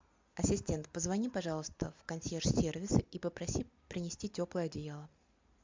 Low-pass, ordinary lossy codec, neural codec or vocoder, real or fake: 7.2 kHz; MP3, 64 kbps; none; real